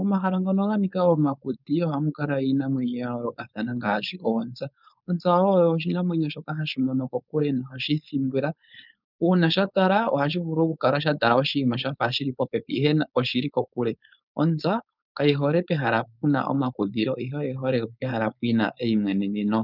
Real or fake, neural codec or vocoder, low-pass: fake; codec, 16 kHz, 4.8 kbps, FACodec; 5.4 kHz